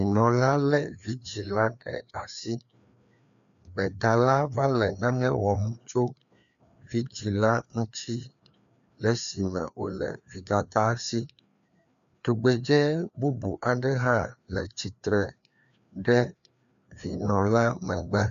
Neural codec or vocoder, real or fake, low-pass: codec, 16 kHz, 2 kbps, FreqCodec, larger model; fake; 7.2 kHz